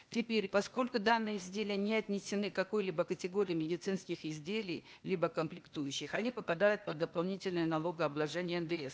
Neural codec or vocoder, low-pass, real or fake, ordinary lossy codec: codec, 16 kHz, 0.8 kbps, ZipCodec; none; fake; none